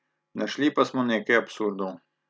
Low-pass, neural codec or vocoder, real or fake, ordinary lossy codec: none; none; real; none